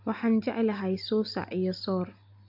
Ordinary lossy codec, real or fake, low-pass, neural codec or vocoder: AAC, 48 kbps; real; 5.4 kHz; none